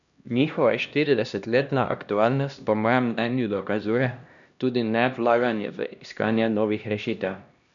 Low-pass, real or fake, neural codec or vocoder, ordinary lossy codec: 7.2 kHz; fake; codec, 16 kHz, 1 kbps, X-Codec, HuBERT features, trained on LibriSpeech; none